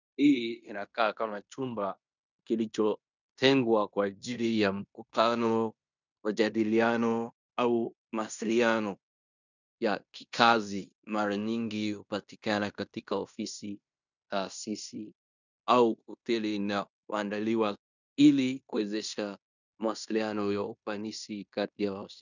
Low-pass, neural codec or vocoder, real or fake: 7.2 kHz; codec, 16 kHz in and 24 kHz out, 0.9 kbps, LongCat-Audio-Codec, fine tuned four codebook decoder; fake